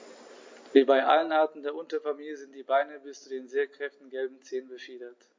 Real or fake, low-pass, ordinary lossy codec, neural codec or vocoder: real; 7.2 kHz; MP3, 48 kbps; none